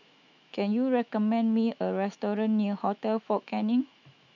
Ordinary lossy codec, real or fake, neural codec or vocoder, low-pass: none; fake; autoencoder, 48 kHz, 128 numbers a frame, DAC-VAE, trained on Japanese speech; 7.2 kHz